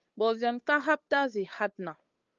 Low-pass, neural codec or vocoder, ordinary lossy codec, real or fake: 7.2 kHz; codec, 16 kHz, 8 kbps, FunCodec, trained on Chinese and English, 25 frames a second; Opus, 24 kbps; fake